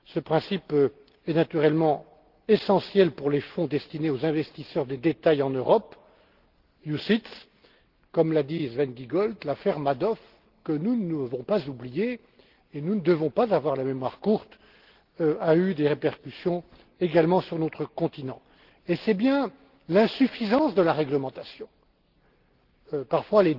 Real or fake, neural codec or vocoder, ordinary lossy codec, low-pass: real; none; Opus, 16 kbps; 5.4 kHz